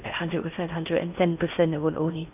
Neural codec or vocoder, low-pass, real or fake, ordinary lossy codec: codec, 16 kHz in and 24 kHz out, 0.8 kbps, FocalCodec, streaming, 65536 codes; 3.6 kHz; fake; none